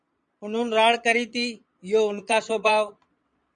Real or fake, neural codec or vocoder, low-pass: fake; vocoder, 22.05 kHz, 80 mel bands, Vocos; 9.9 kHz